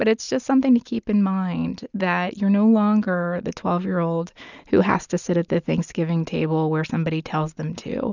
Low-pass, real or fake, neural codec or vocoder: 7.2 kHz; real; none